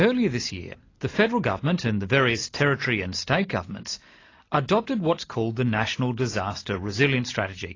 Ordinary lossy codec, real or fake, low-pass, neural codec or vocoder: AAC, 32 kbps; real; 7.2 kHz; none